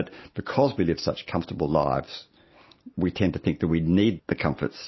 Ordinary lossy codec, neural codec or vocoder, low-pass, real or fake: MP3, 24 kbps; none; 7.2 kHz; real